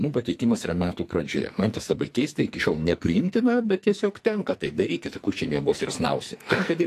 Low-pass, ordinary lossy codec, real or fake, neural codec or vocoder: 14.4 kHz; AAC, 64 kbps; fake; codec, 44.1 kHz, 2.6 kbps, SNAC